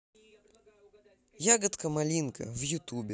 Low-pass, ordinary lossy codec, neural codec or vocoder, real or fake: none; none; none; real